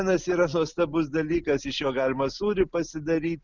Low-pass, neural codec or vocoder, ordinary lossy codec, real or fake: 7.2 kHz; none; Opus, 64 kbps; real